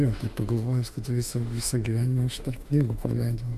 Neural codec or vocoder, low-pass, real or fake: autoencoder, 48 kHz, 32 numbers a frame, DAC-VAE, trained on Japanese speech; 14.4 kHz; fake